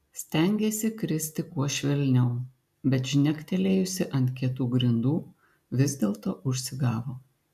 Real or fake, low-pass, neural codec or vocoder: real; 14.4 kHz; none